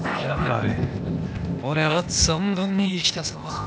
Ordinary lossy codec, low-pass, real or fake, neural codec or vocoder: none; none; fake; codec, 16 kHz, 0.8 kbps, ZipCodec